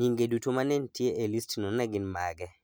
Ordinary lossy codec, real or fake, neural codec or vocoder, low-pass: none; real; none; none